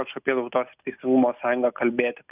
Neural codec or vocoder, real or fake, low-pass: none; real; 3.6 kHz